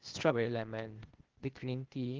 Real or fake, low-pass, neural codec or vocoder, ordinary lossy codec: fake; 7.2 kHz; codec, 16 kHz, 0.8 kbps, ZipCodec; Opus, 16 kbps